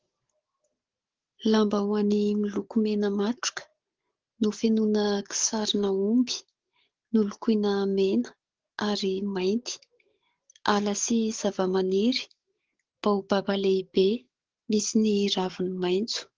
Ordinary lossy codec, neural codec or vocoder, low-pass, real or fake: Opus, 16 kbps; codec, 44.1 kHz, 7.8 kbps, DAC; 7.2 kHz; fake